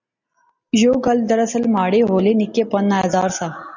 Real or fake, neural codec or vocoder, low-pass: real; none; 7.2 kHz